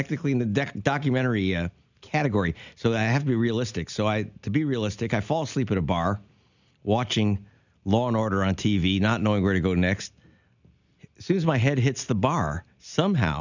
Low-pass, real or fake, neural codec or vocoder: 7.2 kHz; real; none